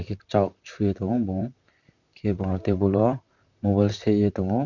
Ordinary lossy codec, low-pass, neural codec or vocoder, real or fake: none; 7.2 kHz; vocoder, 44.1 kHz, 128 mel bands, Pupu-Vocoder; fake